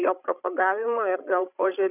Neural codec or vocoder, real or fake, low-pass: codec, 16 kHz, 16 kbps, FreqCodec, larger model; fake; 3.6 kHz